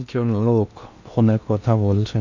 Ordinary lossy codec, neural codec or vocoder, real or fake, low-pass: none; codec, 16 kHz in and 24 kHz out, 0.6 kbps, FocalCodec, streaming, 2048 codes; fake; 7.2 kHz